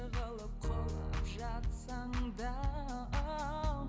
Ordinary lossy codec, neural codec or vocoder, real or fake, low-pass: none; none; real; none